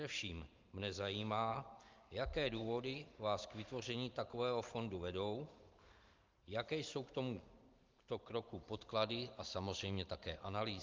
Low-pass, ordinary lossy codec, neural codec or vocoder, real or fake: 7.2 kHz; Opus, 24 kbps; vocoder, 44.1 kHz, 128 mel bands every 512 samples, BigVGAN v2; fake